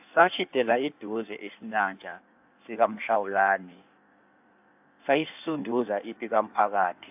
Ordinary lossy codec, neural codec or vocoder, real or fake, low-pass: AAC, 32 kbps; codec, 16 kHz in and 24 kHz out, 1.1 kbps, FireRedTTS-2 codec; fake; 3.6 kHz